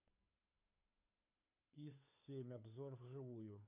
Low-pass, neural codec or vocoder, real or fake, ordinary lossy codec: 3.6 kHz; codec, 16 kHz, 2 kbps, FunCodec, trained on Chinese and English, 25 frames a second; fake; none